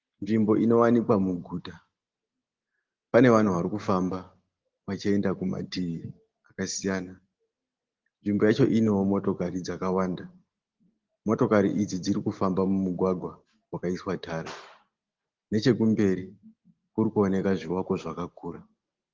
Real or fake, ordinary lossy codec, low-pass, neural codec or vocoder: real; Opus, 16 kbps; 7.2 kHz; none